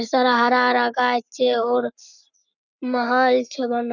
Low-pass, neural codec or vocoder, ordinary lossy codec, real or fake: 7.2 kHz; codec, 44.1 kHz, 7.8 kbps, Pupu-Codec; none; fake